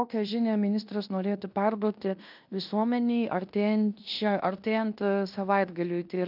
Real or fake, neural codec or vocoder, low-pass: fake; codec, 16 kHz in and 24 kHz out, 0.9 kbps, LongCat-Audio-Codec, fine tuned four codebook decoder; 5.4 kHz